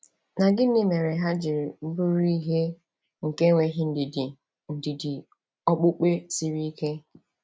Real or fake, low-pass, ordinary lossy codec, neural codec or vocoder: real; none; none; none